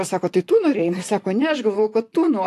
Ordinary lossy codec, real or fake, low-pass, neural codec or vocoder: AAC, 64 kbps; fake; 14.4 kHz; vocoder, 44.1 kHz, 128 mel bands, Pupu-Vocoder